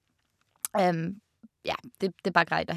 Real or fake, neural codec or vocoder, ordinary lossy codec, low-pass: real; none; none; 14.4 kHz